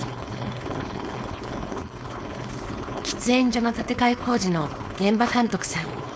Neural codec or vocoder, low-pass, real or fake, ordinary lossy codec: codec, 16 kHz, 4.8 kbps, FACodec; none; fake; none